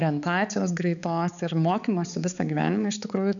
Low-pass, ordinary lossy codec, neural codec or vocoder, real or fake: 7.2 kHz; MP3, 96 kbps; codec, 16 kHz, 4 kbps, X-Codec, HuBERT features, trained on balanced general audio; fake